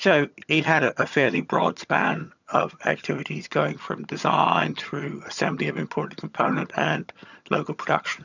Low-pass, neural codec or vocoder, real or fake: 7.2 kHz; vocoder, 22.05 kHz, 80 mel bands, HiFi-GAN; fake